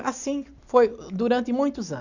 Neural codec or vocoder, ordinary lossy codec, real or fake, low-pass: none; none; real; 7.2 kHz